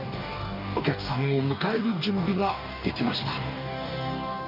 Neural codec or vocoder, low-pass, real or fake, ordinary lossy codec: codec, 44.1 kHz, 2.6 kbps, DAC; 5.4 kHz; fake; none